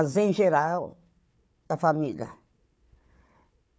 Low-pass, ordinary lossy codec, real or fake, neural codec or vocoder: none; none; fake; codec, 16 kHz, 4 kbps, FunCodec, trained on Chinese and English, 50 frames a second